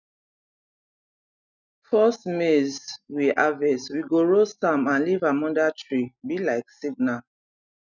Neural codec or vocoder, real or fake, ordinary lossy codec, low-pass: none; real; none; 7.2 kHz